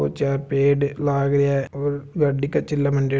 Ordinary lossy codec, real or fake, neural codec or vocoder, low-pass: none; real; none; none